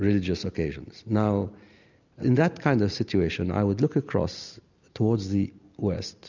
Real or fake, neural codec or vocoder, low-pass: real; none; 7.2 kHz